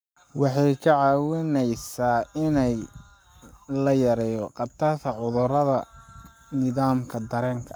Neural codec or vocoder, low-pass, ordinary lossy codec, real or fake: codec, 44.1 kHz, 7.8 kbps, Pupu-Codec; none; none; fake